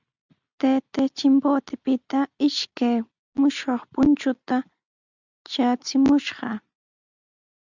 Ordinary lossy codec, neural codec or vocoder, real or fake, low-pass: Opus, 64 kbps; none; real; 7.2 kHz